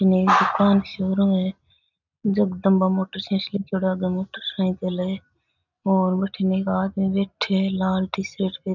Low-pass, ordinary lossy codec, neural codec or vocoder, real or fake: 7.2 kHz; none; none; real